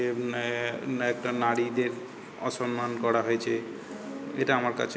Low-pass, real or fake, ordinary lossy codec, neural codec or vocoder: none; real; none; none